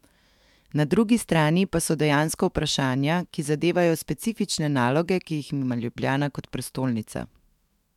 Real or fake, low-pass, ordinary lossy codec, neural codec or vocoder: fake; 19.8 kHz; MP3, 96 kbps; autoencoder, 48 kHz, 128 numbers a frame, DAC-VAE, trained on Japanese speech